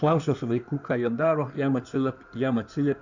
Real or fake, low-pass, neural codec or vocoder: fake; 7.2 kHz; codec, 16 kHz in and 24 kHz out, 2.2 kbps, FireRedTTS-2 codec